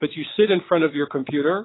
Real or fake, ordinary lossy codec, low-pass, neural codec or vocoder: fake; AAC, 16 kbps; 7.2 kHz; vocoder, 22.05 kHz, 80 mel bands, WaveNeXt